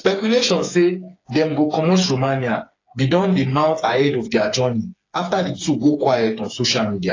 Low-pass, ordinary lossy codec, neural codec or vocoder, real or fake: 7.2 kHz; AAC, 32 kbps; codec, 16 kHz, 4 kbps, FreqCodec, smaller model; fake